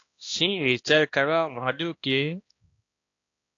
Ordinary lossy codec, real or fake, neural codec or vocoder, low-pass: AAC, 48 kbps; fake; codec, 16 kHz, 1 kbps, X-Codec, HuBERT features, trained on balanced general audio; 7.2 kHz